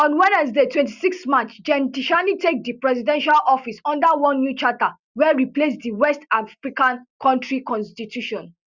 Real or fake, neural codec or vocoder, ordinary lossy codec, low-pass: real; none; Opus, 64 kbps; 7.2 kHz